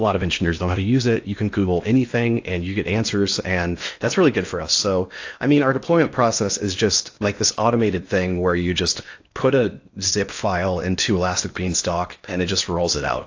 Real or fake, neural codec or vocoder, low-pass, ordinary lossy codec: fake; codec, 16 kHz in and 24 kHz out, 0.8 kbps, FocalCodec, streaming, 65536 codes; 7.2 kHz; AAC, 48 kbps